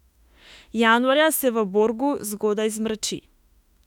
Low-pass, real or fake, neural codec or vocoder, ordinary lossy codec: 19.8 kHz; fake; autoencoder, 48 kHz, 32 numbers a frame, DAC-VAE, trained on Japanese speech; none